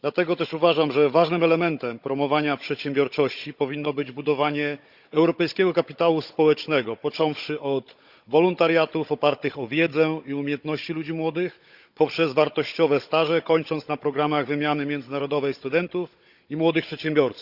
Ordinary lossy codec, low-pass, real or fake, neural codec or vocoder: Opus, 64 kbps; 5.4 kHz; fake; codec, 16 kHz, 16 kbps, FunCodec, trained on Chinese and English, 50 frames a second